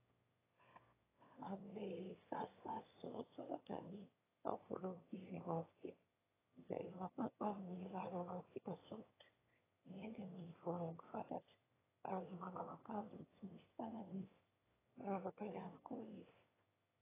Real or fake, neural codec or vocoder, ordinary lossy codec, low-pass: fake; autoencoder, 22.05 kHz, a latent of 192 numbers a frame, VITS, trained on one speaker; AAC, 16 kbps; 3.6 kHz